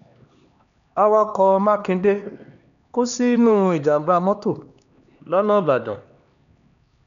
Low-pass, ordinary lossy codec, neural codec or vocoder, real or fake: 7.2 kHz; none; codec, 16 kHz, 2 kbps, X-Codec, HuBERT features, trained on LibriSpeech; fake